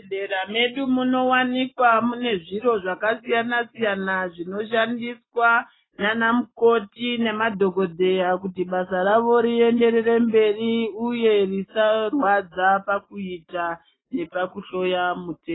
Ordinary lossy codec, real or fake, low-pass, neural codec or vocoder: AAC, 16 kbps; real; 7.2 kHz; none